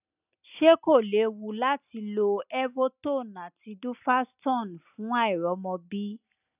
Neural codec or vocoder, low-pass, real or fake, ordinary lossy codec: none; 3.6 kHz; real; none